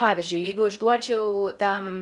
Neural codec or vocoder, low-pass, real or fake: codec, 16 kHz in and 24 kHz out, 0.6 kbps, FocalCodec, streaming, 4096 codes; 10.8 kHz; fake